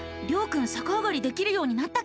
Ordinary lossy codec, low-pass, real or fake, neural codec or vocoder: none; none; real; none